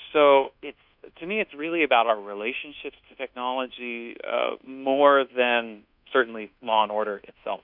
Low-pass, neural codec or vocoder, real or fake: 5.4 kHz; codec, 24 kHz, 1.2 kbps, DualCodec; fake